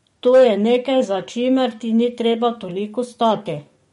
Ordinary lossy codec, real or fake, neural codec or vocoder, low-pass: MP3, 48 kbps; fake; codec, 44.1 kHz, 7.8 kbps, DAC; 19.8 kHz